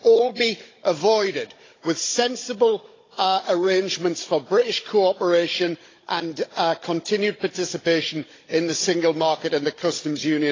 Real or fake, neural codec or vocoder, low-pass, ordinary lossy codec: fake; codec, 16 kHz, 16 kbps, FunCodec, trained on Chinese and English, 50 frames a second; 7.2 kHz; AAC, 32 kbps